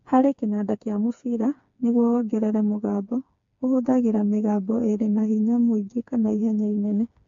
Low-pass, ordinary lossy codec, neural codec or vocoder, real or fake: 7.2 kHz; MP3, 48 kbps; codec, 16 kHz, 4 kbps, FreqCodec, smaller model; fake